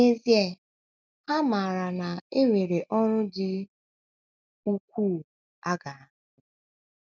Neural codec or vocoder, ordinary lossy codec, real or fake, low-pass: none; none; real; none